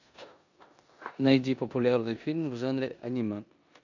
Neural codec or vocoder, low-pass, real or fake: codec, 16 kHz in and 24 kHz out, 0.9 kbps, LongCat-Audio-Codec, four codebook decoder; 7.2 kHz; fake